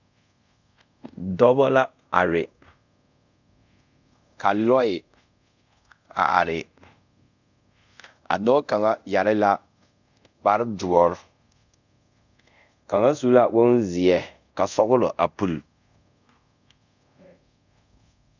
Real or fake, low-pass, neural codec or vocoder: fake; 7.2 kHz; codec, 24 kHz, 0.5 kbps, DualCodec